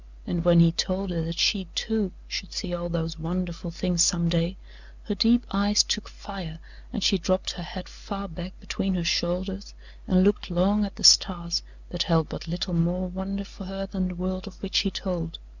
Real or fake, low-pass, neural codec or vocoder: real; 7.2 kHz; none